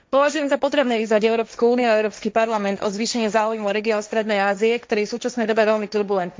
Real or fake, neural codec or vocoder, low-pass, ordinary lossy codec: fake; codec, 16 kHz, 1.1 kbps, Voila-Tokenizer; none; none